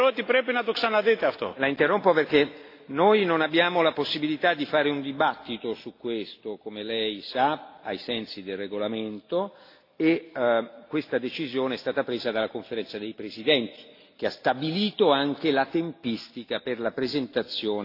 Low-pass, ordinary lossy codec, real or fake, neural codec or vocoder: 5.4 kHz; AAC, 32 kbps; real; none